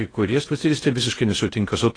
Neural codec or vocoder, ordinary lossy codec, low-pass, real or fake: codec, 16 kHz in and 24 kHz out, 0.6 kbps, FocalCodec, streaming, 2048 codes; AAC, 32 kbps; 9.9 kHz; fake